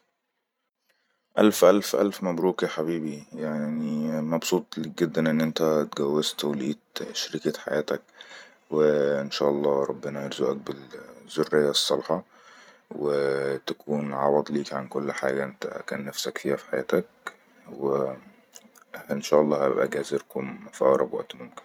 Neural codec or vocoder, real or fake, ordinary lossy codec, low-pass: vocoder, 44.1 kHz, 128 mel bands every 512 samples, BigVGAN v2; fake; none; 19.8 kHz